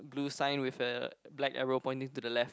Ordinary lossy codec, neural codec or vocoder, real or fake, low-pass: none; none; real; none